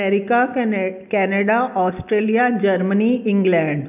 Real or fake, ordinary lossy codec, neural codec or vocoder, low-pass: real; none; none; 3.6 kHz